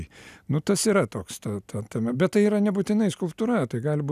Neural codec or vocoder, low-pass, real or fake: none; 14.4 kHz; real